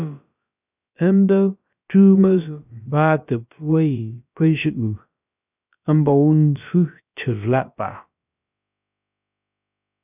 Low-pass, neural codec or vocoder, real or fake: 3.6 kHz; codec, 16 kHz, about 1 kbps, DyCAST, with the encoder's durations; fake